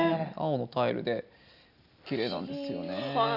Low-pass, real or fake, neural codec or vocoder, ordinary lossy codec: 5.4 kHz; real; none; none